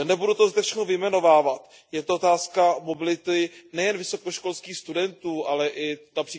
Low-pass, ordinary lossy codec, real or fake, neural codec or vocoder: none; none; real; none